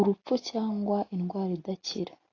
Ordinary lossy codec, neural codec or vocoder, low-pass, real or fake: AAC, 32 kbps; none; 7.2 kHz; real